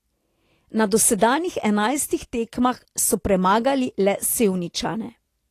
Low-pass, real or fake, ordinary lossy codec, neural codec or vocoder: 14.4 kHz; real; AAC, 48 kbps; none